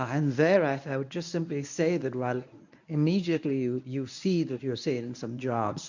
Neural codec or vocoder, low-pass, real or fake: codec, 24 kHz, 0.9 kbps, WavTokenizer, medium speech release version 1; 7.2 kHz; fake